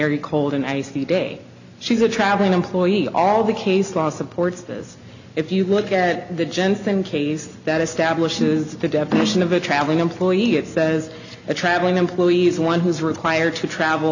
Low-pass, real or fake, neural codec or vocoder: 7.2 kHz; real; none